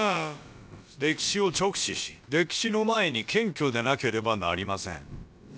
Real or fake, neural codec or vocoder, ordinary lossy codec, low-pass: fake; codec, 16 kHz, about 1 kbps, DyCAST, with the encoder's durations; none; none